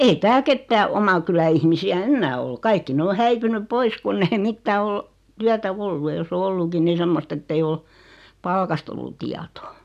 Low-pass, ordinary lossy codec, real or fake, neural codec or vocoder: 14.4 kHz; none; real; none